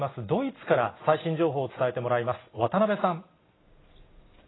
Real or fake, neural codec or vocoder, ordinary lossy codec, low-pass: real; none; AAC, 16 kbps; 7.2 kHz